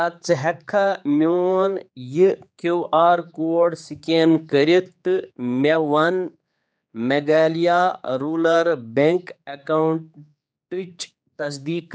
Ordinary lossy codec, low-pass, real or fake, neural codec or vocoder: none; none; fake; codec, 16 kHz, 4 kbps, X-Codec, HuBERT features, trained on general audio